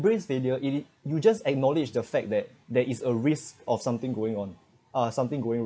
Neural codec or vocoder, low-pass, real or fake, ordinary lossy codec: none; none; real; none